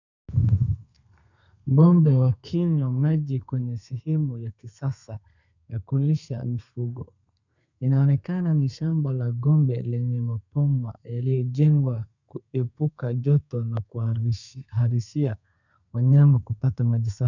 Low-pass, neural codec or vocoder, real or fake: 7.2 kHz; codec, 32 kHz, 1.9 kbps, SNAC; fake